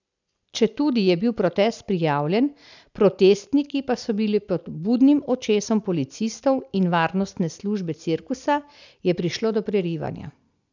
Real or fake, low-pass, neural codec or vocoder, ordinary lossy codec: real; 7.2 kHz; none; none